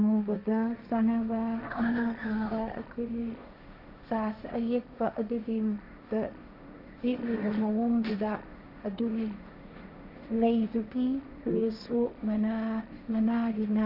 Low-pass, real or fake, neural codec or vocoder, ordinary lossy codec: 5.4 kHz; fake; codec, 16 kHz, 1.1 kbps, Voila-Tokenizer; none